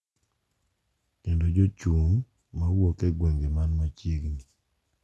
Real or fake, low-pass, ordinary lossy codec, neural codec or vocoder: real; none; none; none